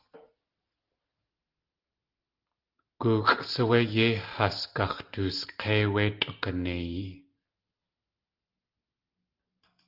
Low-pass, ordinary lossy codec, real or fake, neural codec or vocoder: 5.4 kHz; Opus, 32 kbps; real; none